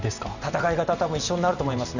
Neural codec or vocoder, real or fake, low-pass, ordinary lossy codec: none; real; 7.2 kHz; none